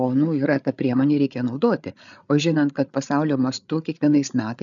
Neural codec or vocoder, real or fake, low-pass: codec, 16 kHz, 8 kbps, FreqCodec, larger model; fake; 7.2 kHz